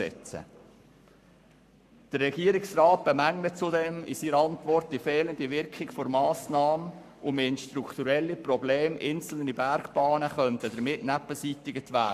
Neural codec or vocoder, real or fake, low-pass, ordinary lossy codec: codec, 44.1 kHz, 7.8 kbps, Pupu-Codec; fake; 14.4 kHz; none